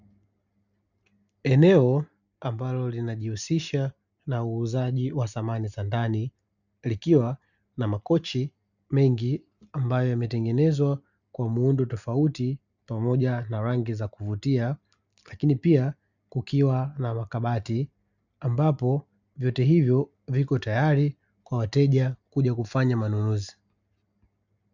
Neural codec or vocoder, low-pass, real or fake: none; 7.2 kHz; real